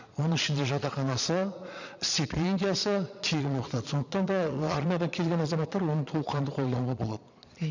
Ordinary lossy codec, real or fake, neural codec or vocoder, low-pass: none; fake; vocoder, 22.05 kHz, 80 mel bands, Vocos; 7.2 kHz